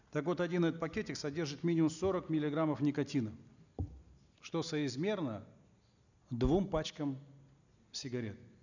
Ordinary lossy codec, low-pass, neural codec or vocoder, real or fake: none; 7.2 kHz; none; real